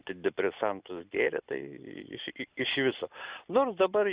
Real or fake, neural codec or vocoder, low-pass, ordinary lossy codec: real; none; 3.6 kHz; Opus, 64 kbps